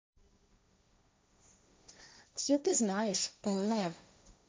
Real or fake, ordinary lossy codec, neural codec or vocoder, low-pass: fake; none; codec, 16 kHz, 1.1 kbps, Voila-Tokenizer; none